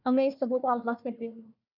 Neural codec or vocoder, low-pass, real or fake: codec, 16 kHz, 1 kbps, FunCodec, trained on Chinese and English, 50 frames a second; 5.4 kHz; fake